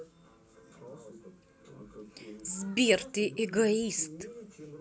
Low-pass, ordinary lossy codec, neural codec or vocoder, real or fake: none; none; none; real